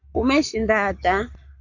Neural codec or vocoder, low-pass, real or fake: codec, 44.1 kHz, 7.8 kbps, Pupu-Codec; 7.2 kHz; fake